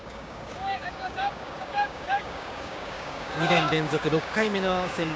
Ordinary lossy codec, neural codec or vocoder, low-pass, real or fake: none; codec, 16 kHz, 6 kbps, DAC; none; fake